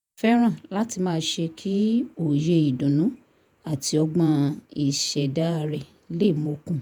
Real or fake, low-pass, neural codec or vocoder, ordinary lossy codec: fake; none; vocoder, 48 kHz, 128 mel bands, Vocos; none